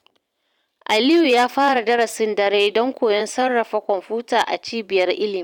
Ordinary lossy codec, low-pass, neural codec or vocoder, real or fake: none; 19.8 kHz; vocoder, 44.1 kHz, 128 mel bands every 512 samples, BigVGAN v2; fake